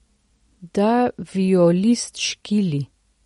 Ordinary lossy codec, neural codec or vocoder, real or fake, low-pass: MP3, 48 kbps; none; real; 19.8 kHz